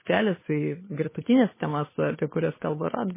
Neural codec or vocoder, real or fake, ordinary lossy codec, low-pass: codec, 16 kHz, 2 kbps, FunCodec, trained on Chinese and English, 25 frames a second; fake; MP3, 16 kbps; 3.6 kHz